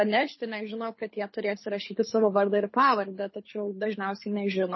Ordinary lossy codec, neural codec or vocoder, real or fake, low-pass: MP3, 24 kbps; codec, 24 kHz, 6 kbps, HILCodec; fake; 7.2 kHz